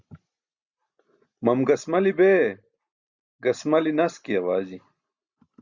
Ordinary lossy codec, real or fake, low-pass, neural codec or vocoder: Opus, 64 kbps; real; 7.2 kHz; none